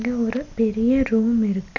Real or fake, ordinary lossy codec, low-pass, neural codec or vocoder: real; none; 7.2 kHz; none